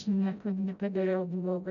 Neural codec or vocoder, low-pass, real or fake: codec, 16 kHz, 0.5 kbps, FreqCodec, smaller model; 7.2 kHz; fake